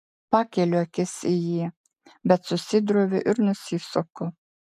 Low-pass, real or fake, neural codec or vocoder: 14.4 kHz; real; none